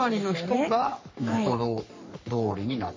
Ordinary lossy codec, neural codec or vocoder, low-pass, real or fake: MP3, 32 kbps; codec, 44.1 kHz, 3.4 kbps, Pupu-Codec; 7.2 kHz; fake